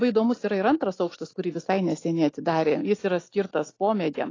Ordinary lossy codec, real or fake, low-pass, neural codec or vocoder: AAC, 32 kbps; real; 7.2 kHz; none